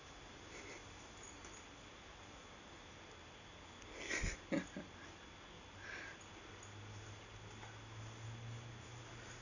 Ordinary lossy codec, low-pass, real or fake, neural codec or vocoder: none; 7.2 kHz; real; none